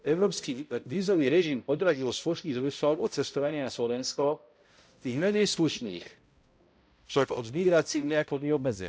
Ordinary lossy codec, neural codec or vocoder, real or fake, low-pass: none; codec, 16 kHz, 0.5 kbps, X-Codec, HuBERT features, trained on balanced general audio; fake; none